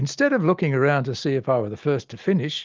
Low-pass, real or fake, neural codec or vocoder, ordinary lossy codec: 7.2 kHz; real; none; Opus, 32 kbps